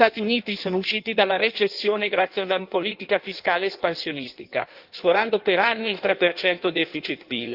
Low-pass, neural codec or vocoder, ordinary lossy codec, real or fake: 5.4 kHz; codec, 16 kHz in and 24 kHz out, 1.1 kbps, FireRedTTS-2 codec; Opus, 24 kbps; fake